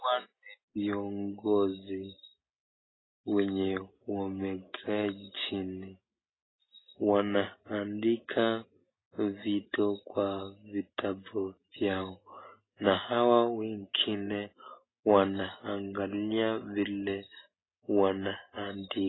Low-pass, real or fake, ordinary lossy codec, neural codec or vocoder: 7.2 kHz; real; AAC, 16 kbps; none